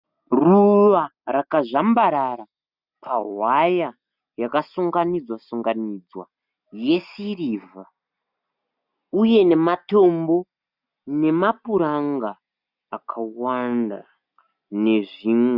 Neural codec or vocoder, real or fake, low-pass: none; real; 5.4 kHz